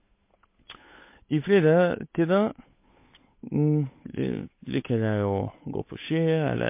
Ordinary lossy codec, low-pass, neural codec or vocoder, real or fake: MP3, 24 kbps; 3.6 kHz; codec, 16 kHz, 8 kbps, FunCodec, trained on Chinese and English, 25 frames a second; fake